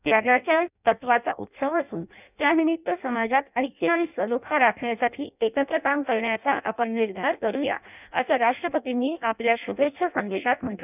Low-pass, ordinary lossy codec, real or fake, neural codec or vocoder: 3.6 kHz; none; fake; codec, 16 kHz in and 24 kHz out, 0.6 kbps, FireRedTTS-2 codec